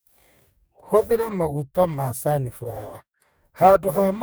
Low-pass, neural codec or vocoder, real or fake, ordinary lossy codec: none; codec, 44.1 kHz, 2.6 kbps, DAC; fake; none